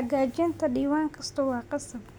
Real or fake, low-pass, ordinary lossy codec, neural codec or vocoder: real; none; none; none